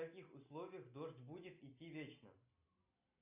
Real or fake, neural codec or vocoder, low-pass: real; none; 3.6 kHz